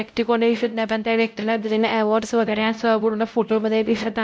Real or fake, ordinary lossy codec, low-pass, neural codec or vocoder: fake; none; none; codec, 16 kHz, 0.5 kbps, X-Codec, WavLM features, trained on Multilingual LibriSpeech